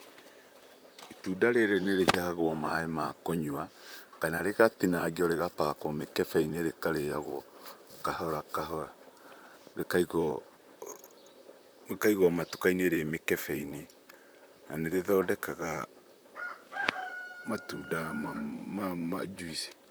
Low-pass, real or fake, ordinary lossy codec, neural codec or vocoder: none; fake; none; vocoder, 44.1 kHz, 128 mel bands, Pupu-Vocoder